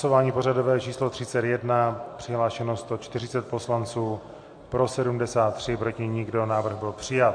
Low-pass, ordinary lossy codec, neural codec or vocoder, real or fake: 9.9 kHz; MP3, 48 kbps; none; real